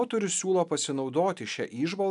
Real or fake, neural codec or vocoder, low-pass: real; none; 10.8 kHz